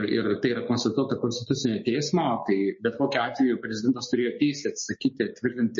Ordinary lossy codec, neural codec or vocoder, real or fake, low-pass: MP3, 32 kbps; codec, 16 kHz, 6 kbps, DAC; fake; 7.2 kHz